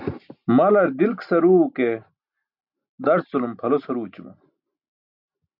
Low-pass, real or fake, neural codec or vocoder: 5.4 kHz; real; none